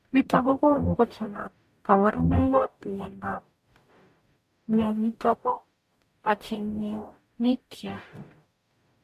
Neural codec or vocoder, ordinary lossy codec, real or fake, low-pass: codec, 44.1 kHz, 0.9 kbps, DAC; MP3, 64 kbps; fake; 14.4 kHz